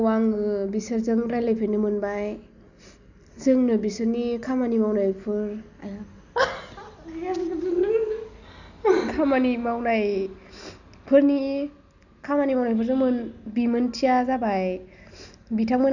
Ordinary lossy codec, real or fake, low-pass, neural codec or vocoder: none; real; 7.2 kHz; none